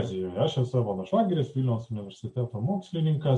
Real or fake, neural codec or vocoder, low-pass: real; none; 10.8 kHz